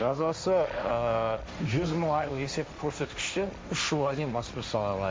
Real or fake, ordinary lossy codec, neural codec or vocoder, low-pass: fake; none; codec, 16 kHz, 1.1 kbps, Voila-Tokenizer; none